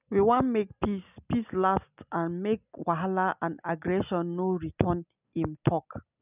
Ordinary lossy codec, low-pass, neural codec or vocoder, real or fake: none; 3.6 kHz; none; real